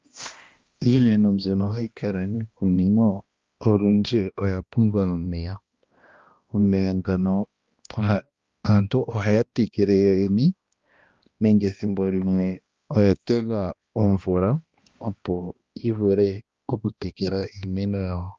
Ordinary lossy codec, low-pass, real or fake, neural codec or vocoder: Opus, 32 kbps; 7.2 kHz; fake; codec, 16 kHz, 1 kbps, X-Codec, HuBERT features, trained on balanced general audio